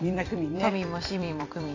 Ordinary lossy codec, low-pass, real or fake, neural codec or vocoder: AAC, 32 kbps; 7.2 kHz; fake; vocoder, 44.1 kHz, 128 mel bands every 256 samples, BigVGAN v2